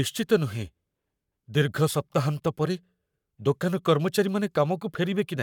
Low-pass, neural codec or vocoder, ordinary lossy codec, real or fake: 19.8 kHz; codec, 44.1 kHz, 7.8 kbps, Pupu-Codec; none; fake